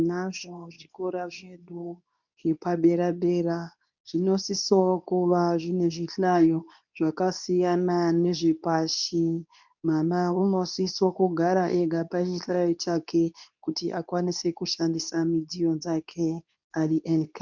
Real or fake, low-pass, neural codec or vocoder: fake; 7.2 kHz; codec, 24 kHz, 0.9 kbps, WavTokenizer, medium speech release version 2